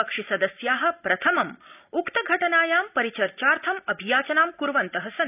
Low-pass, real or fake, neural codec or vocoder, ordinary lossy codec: 3.6 kHz; real; none; none